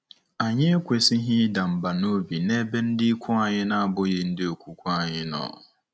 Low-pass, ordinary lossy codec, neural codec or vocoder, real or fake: none; none; none; real